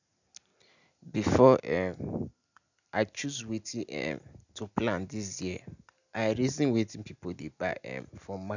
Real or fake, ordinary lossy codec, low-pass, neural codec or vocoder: real; none; 7.2 kHz; none